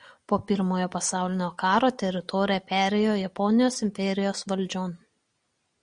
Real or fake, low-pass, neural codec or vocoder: real; 9.9 kHz; none